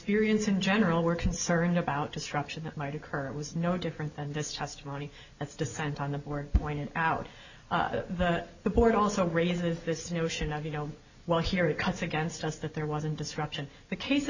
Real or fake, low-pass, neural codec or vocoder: real; 7.2 kHz; none